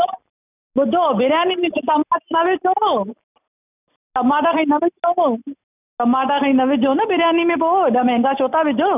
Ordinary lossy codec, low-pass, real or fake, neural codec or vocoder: none; 3.6 kHz; real; none